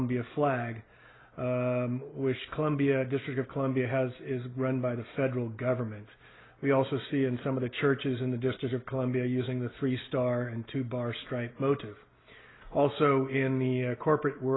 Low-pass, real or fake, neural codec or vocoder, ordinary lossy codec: 7.2 kHz; real; none; AAC, 16 kbps